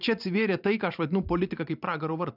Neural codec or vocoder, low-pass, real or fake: vocoder, 44.1 kHz, 128 mel bands every 512 samples, BigVGAN v2; 5.4 kHz; fake